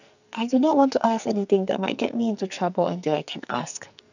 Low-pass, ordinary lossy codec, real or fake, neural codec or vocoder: 7.2 kHz; none; fake; codec, 44.1 kHz, 2.6 kbps, SNAC